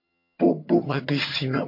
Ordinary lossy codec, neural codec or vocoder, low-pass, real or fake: MP3, 32 kbps; vocoder, 22.05 kHz, 80 mel bands, HiFi-GAN; 5.4 kHz; fake